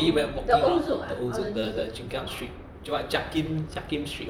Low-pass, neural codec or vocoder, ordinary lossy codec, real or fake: 19.8 kHz; vocoder, 44.1 kHz, 128 mel bands, Pupu-Vocoder; none; fake